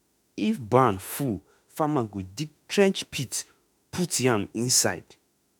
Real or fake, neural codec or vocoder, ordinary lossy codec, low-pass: fake; autoencoder, 48 kHz, 32 numbers a frame, DAC-VAE, trained on Japanese speech; none; none